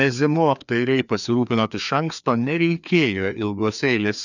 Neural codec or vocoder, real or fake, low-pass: codec, 16 kHz, 2 kbps, FreqCodec, larger model; fake; 7.2 kHz